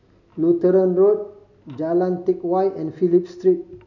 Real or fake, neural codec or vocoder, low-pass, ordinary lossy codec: real; none; 7.2 kHz; none